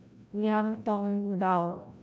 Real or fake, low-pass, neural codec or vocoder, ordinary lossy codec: fake; none; codec, 16 kHz, 0.5 kbps, FreqCodec, larger model; none